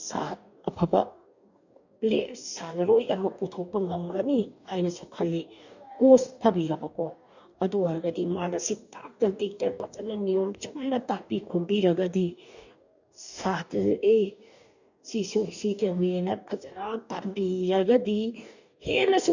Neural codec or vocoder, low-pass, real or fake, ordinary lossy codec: codec, 44.1 kHz, 2.6 kbps, DAC; 7.2 kHz; fake; none